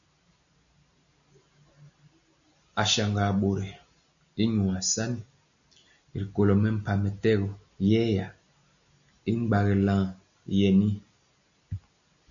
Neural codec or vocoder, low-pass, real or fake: none; 7.2 kHz; real